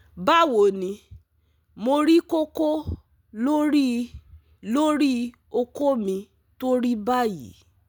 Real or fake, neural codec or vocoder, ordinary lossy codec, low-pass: real; none; none; none